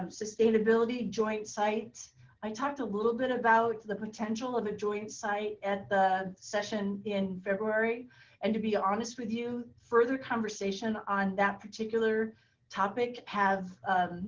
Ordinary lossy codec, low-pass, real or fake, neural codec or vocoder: Opus, 16 kbps; 7.2 kHz; real; none